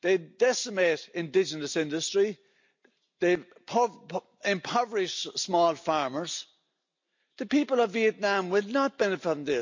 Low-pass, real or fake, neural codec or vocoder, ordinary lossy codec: 7.2 kHz; real; none; MP3, 48 kbps